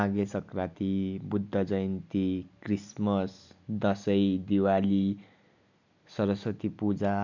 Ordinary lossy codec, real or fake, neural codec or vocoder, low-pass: none; real; none; 7.2 kHz